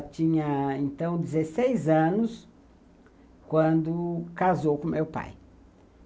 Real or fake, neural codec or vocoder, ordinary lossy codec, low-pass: real; none; none; none